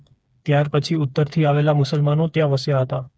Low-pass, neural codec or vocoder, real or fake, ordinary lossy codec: none; codec, 16 kHz, 4 kbps, FreqCodec, smaller model; fake; none